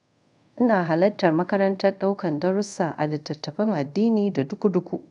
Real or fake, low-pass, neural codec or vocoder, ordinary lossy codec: fake; 10.8 kHz; codec, 24 kHz, 0.5 kbps, DualCodec; none